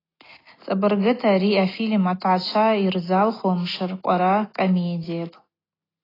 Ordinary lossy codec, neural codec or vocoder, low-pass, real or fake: AAC, 24 kbps; none; 5.4 kHz; real